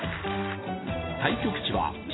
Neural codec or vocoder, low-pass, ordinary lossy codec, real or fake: none; 7.2 kHz; AAC, 16 kbps; real